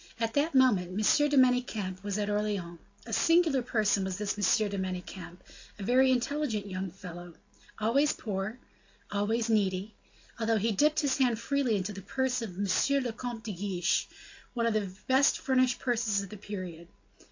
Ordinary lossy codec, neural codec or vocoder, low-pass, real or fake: AAC, 48 kbps; none; 7.2 kHz; real